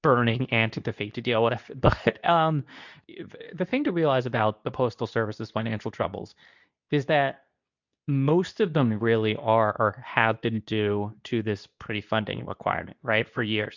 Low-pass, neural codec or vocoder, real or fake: 7.2 kHz; codec, 24 kHz, 0.9 kbps, WavTokenizer, medium speech release version 2; fake